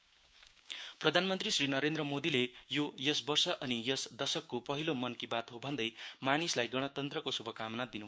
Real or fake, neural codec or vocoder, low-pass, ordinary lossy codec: fake; codec, 16 kHz, 6 kbps, DAC; none; none